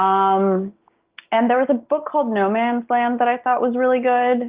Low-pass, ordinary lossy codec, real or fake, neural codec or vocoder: 3.6 kHz; Opus, 32 kbps; real; none